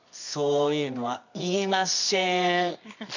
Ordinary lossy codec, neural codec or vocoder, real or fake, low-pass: none; codec, 24 kHz, 0.9 kbps, WavTokenizer, medium music audio release; fake; 7.2 kHz